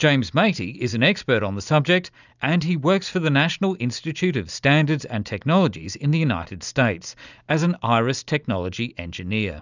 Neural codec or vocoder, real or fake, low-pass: none; real; 7.2 kHz